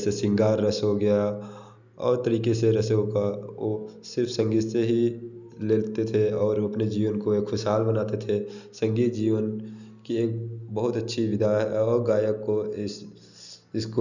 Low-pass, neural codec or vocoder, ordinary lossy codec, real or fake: 7.2 kHz; none; none; real